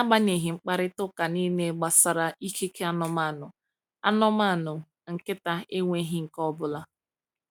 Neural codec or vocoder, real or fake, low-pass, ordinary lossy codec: none; real; none; none